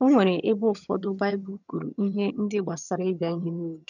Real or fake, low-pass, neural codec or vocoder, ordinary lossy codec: fake; 7.2 kHz; vocoder, 22.05 kHz, 80 mel bands, HiFi-GAN; none